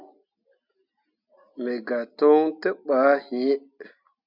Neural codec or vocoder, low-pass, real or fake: none; 5.4 kHz; real